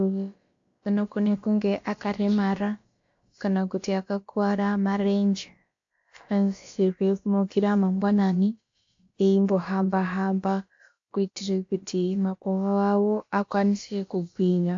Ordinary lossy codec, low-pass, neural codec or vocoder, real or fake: AAC, 48 kbps; 7.2 kHz; codec, 16 kHz, about 1 kbps, DyCAST, with the encoder's durations; fake